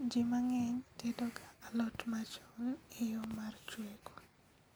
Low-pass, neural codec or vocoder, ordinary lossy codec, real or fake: none; none; none; real